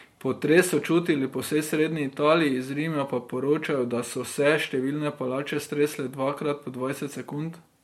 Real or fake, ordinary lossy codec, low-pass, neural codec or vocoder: real; MP3, 64 kbps; 19.8 kHz; none